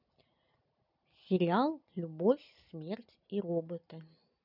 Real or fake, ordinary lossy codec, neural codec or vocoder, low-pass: real; none; none; 5.4 kHz